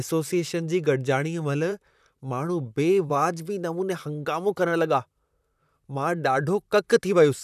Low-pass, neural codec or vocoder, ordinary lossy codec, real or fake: 14.4 kHz; vocoder, 44.1 kHz, 128 mel bands, Pupu-Vocoder; none; fake